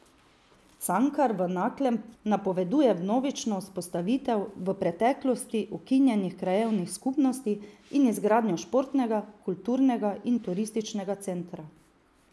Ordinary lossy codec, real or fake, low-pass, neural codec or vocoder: none; real; none; none